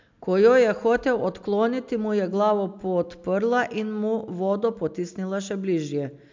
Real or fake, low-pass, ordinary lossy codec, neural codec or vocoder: real; 7.2 kHz; MP3, 64 kbps; none